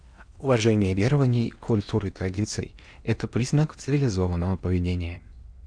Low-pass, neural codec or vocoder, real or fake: 9.9 kHz; codec, 16 kHz in and 24 kHz out, 0.8 kbps, FocalCodec, streaming, 65536 codes; fake